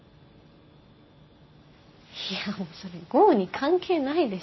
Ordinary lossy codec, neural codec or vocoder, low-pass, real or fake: MP3, 24 kbps; none; 7.2 kHz; real